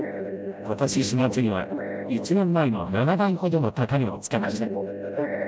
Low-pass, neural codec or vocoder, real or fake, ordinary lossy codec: none; codec, 16 kHz, 0.5 kbps, FreqCodec, smaller model; fake; none